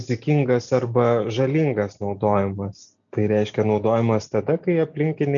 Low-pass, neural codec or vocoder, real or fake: 7.2 kHz; none; real